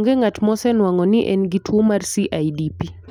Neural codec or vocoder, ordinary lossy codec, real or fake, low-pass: none; none; real; 19.8 kHz